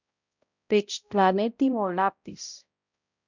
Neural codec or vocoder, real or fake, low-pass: codec, 16 kHz, 0.5 kbps, X-Codec, HuBERT features, trained on balanced general audio; fake; 7.2 kHz